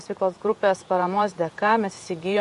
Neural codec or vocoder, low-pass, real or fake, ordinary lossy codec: vocoder, 48 kHz, 128 mel bands, Vocos; 14.4 kHz; fake; MP3, 48 kbps